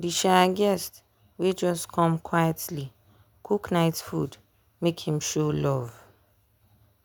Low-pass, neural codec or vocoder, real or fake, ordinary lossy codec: none; none; real; none